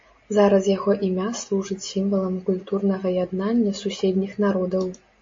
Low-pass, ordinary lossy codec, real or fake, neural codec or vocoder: 7.2 kHz; MP3, 32 kbps; real; none